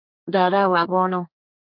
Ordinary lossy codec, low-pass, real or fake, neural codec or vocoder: MP3, 48 kbps; 5.4 kHz; fake; codec, 32 kHz, 1.9 kbps, SNAC